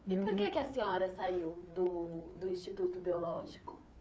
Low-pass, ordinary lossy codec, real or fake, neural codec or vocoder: none; none; fake; codec, 16 kHz, 4 kbps, FreqCodec, larger model